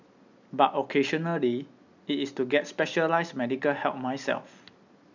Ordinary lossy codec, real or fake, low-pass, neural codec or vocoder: none; real; 7.2 kHz; none